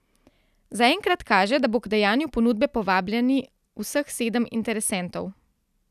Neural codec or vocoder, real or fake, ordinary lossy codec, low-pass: none; real; none; 14.4 kHz